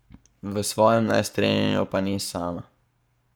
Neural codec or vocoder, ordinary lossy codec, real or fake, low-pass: codec, 44.1 kHz, 7.8 kbps, Pupu-Codec; none; fake; none